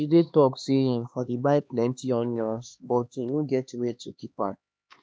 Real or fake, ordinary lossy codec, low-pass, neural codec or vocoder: fake; none; none; codec, 16 kHz, 2 kbps, X-Codec, HuBERT features, trained on LibriSpeech